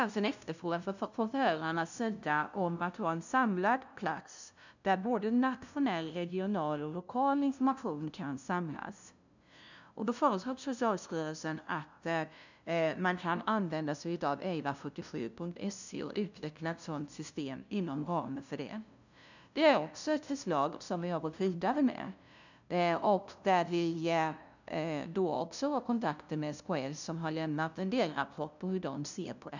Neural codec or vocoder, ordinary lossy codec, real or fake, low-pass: codec, 16 kHz, 0.5 kbps, FunCodec, trained on LibriTTS, 25 frames a second; none; fake; 7.2 kHz